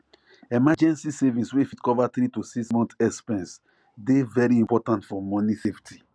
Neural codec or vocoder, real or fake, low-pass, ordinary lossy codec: none; real; none; none